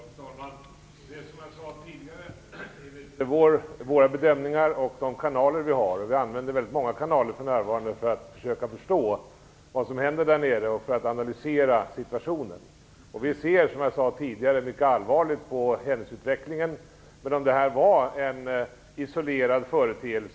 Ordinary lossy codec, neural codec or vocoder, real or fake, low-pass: none; none; real; none